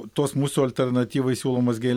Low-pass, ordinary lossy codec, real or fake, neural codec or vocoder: 19.8 kHz; MP3, 96 kbps; real; none